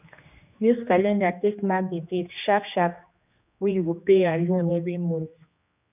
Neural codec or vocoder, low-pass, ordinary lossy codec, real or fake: codec, 16 kHz, 2 kbps, X-Codec, HuBERT features, trained on general audio; 3.6 kHz; none; fake